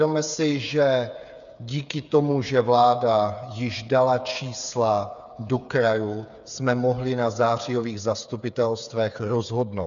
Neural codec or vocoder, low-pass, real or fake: codec, 16 kHz, 8 kbps, FreqCodec, smaller model; 7.2 kHz; fake